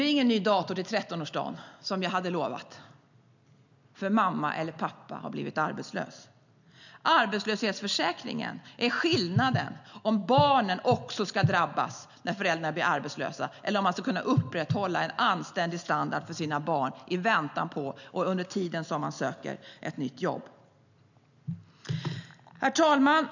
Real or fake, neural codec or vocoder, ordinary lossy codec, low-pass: real; none; none; 7.2 kHz